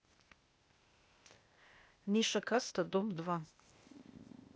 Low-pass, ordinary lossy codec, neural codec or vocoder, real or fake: none; none; codec, 16 kHz, 0.8 kbps, ZipCodec; fake